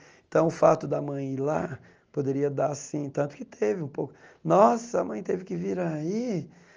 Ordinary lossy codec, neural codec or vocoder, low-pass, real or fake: Opus, 24 kbps; none; 7.2 kHz; real